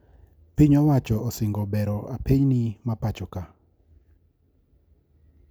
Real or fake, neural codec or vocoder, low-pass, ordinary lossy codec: real; none; none; none